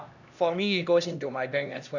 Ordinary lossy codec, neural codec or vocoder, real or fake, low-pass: none; codec, 16 kHz, 1 kbps, X-Codec, HuBERT features, trained on LibriSpeech; fake; 7.2 kHz